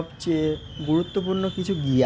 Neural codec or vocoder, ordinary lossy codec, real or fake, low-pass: none; none; real; none